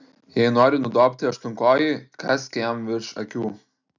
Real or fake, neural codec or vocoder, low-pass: real; none; 7.2 kHz